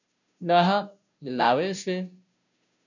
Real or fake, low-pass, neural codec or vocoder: fake; 7.2 kHz; codec, 16 kHz, 0.5 kbps, FunCodec, trained on Chinese and English, 25 frames a second